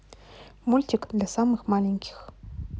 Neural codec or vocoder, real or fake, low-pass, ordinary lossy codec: none; real; none; none